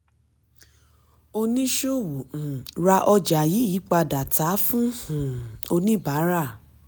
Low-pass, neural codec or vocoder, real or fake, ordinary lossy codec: none; none; real; none